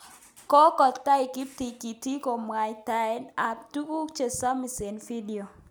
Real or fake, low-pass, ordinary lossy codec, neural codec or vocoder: real; none; none; none